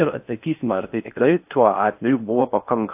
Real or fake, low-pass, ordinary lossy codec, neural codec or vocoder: fake; 3.6 kHz; AAC, 32 kbps; codec, 16 kHz in and 24 kHz out, 0.6 kbps, FocalCodec, streaming, 4096 codes